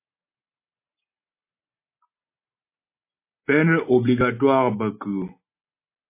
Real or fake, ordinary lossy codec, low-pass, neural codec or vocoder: real; MP3, 24 kbps; 3.6 kHz; none